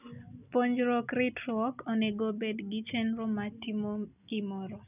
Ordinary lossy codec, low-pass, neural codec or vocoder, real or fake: none; 3.6 kHz; none; real